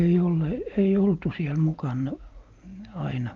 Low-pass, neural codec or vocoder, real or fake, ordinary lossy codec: 7.2 kHz; none; real; Opus, 24 kbps